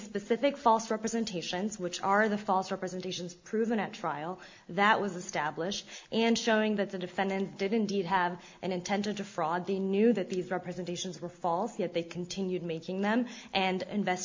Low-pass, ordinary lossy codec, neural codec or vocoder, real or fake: 7.2 kHz; MP3, 64 kbps; none; real